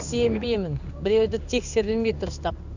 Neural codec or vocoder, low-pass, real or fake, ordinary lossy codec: codec, 16 kHz in and 24 kHz out, 1 kbps, XY-Tokenizer; 7.2 kHz; fake; none